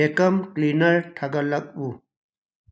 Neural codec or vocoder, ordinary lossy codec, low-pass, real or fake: none; none; none; real